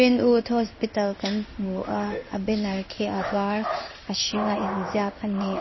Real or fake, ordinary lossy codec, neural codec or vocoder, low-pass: fake; MP3, 24 kbps; codec, 16 kHz in and 24 kHz out, 1 kbps, XY-Tokenizer; 7.2 kHz